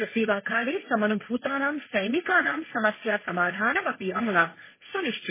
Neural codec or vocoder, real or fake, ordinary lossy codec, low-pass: codec, 16 kHz, 1.1 kbps, Voila-Tokenizer; fake; MP3, 16 kbps; 3.6 kHz